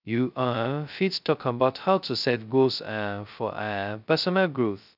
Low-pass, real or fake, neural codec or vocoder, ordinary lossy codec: 5.4 kHz; fake; codec, 16 kHz, 0.2 kbps, FocalCodec; none